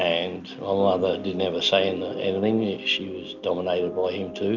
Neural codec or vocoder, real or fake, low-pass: none; real; 7.2 kHz